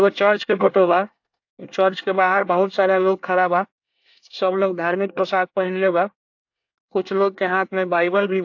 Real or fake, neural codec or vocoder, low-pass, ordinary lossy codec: fake; codec, 24 kHz, 1 kbps, SNAC; 7.2 kHz; AAC, 48 kbps